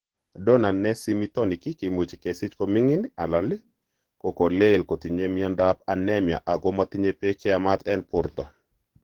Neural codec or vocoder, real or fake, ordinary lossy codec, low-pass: codec, 44.1 kHz, 7.8 kbps, DAC; fake; Opus, 16 kbps; 19.8 kHz